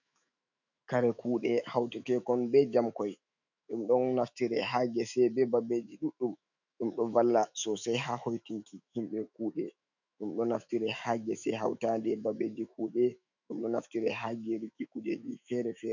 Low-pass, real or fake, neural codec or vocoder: 7.2 kHz; fake; autoencoder, 48 kHz, 128 numbers a frame, DAC-VAE, trained on Japanese speech